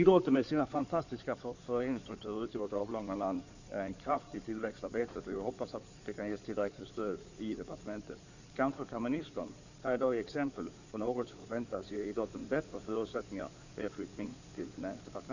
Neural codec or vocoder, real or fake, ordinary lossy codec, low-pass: codec, 16 kHz in and 24 kHz out, 2.2 kbps, FireRedTTS-2 codec; fake; none; 7.2 kHz